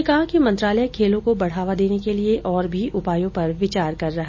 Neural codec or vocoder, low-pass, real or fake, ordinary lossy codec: none; 7.2 kHz; real; MP3, 32 kbps